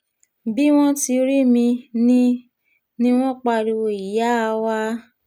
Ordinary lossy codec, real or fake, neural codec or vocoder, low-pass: none; real; none; 19.8 kHz